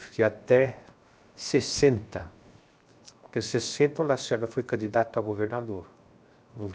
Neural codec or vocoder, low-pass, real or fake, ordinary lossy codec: codec, 16 kHz, 0.7 kbps, FocalCodec; none; fake; none